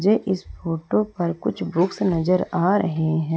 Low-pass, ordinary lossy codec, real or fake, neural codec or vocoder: none; none; real; none